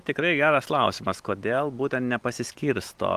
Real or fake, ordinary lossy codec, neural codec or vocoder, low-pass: real; Opus, 32 kbps; none; 14.4 kHz